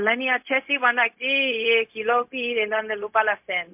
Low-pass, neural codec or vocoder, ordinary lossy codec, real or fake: 3.6 kHz; codec, 16 kHz, 0.4 kbps, LongCat-Audio-Codec; MP3, 32 kbps; fake